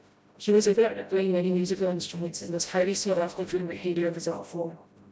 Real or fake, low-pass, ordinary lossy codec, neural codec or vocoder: fake; none; none; codec, 16 kHz, 0.5 kbps, FreqCodec, smaller model